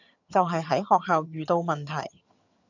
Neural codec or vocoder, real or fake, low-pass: vocoder, 22.05 kHz, 80 mel bands, HiFi-GAN; fake; 7.2 kHz